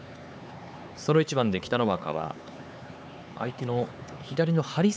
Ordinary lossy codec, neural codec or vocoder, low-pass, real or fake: none; codec, 16 kHz, 4 kbps, X-Codec, HuBERT features, trained on LibriSpeech; none; fake